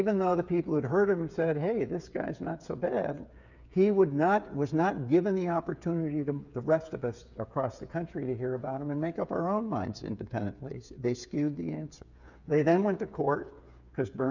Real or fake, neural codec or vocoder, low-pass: fake; codec, 16 kHz, 8 kbps, FreqCodec, smaller model; 7.2 kHz